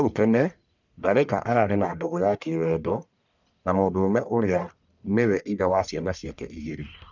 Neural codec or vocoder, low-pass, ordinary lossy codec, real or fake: codec, 44.1 kHz, 1.7 kbps, Pupu-Codec; 7.2 kHz; none; fake